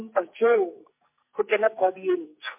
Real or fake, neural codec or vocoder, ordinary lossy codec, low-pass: fake; codec, 44.1 kHz, 2.6 kbps, SNAC; MP3, 24 kbps; 3.6 kHz